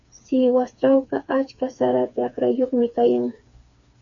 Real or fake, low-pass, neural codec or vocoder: fake; 7.2 kHz; codec, 16 kHz, 4 kbps, FreqCodec, smaller model